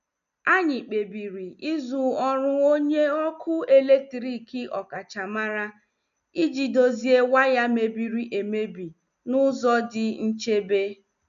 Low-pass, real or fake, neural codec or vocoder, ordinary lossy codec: 7.2 kHz; real; none; none